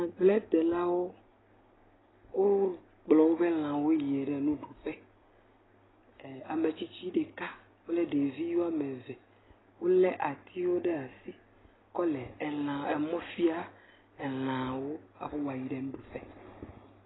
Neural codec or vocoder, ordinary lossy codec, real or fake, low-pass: none; AAC, 16 kbps; real; 7.2 kHz